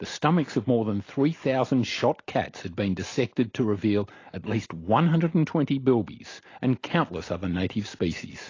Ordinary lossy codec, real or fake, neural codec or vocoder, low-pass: AAC, 32 kbps; real; none; 7.2 kHz